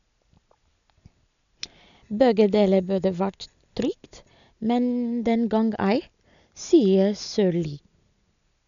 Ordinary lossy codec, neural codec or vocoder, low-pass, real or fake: none; none; 7.2 kHz; real